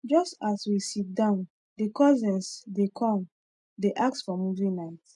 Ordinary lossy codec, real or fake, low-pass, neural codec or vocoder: none; real; 10.8 kHz; none